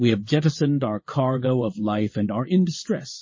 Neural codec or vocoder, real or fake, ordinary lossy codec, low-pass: vocoder, 22.05 kHz, 80 mel bands, WaveNeXt; fake; MP3, 32 kbps; 7.2 kHz